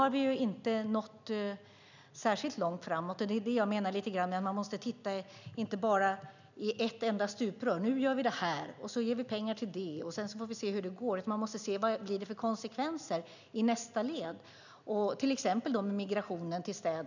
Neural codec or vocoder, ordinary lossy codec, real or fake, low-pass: none; none; real; 7.2 kHz